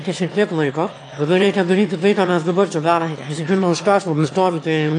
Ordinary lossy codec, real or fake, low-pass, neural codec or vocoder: AAC, 48 kbps; fake; 9.9 kHz; autoencoder, 22.05 kHz, a latent of 192 numbers a frame, VITS, trained on one speaker